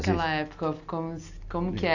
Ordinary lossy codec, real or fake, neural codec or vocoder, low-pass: none; real; none; 7.2 kHz